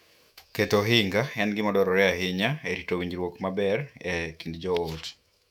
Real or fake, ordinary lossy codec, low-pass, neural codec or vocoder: fake; none; 19.8 kHz; autoencoder, 48 kHz, 128 numbers a frame, DAC-VAE, trained on Japanese speech